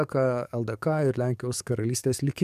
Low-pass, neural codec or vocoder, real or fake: 14.4 kHz; codec, 44.1 kHz, 7.8 kbps, DAC; fake